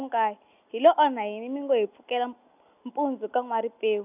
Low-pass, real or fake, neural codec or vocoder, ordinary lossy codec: 3.6 kHz; real; none; none